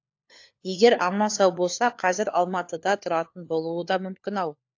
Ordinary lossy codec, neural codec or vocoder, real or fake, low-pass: AAC, 48 kbps; codec, 16 kHz, 4 kbps, FunCodec, trained on LibriTTS, 50 frames a second; fake; 7.2 kHz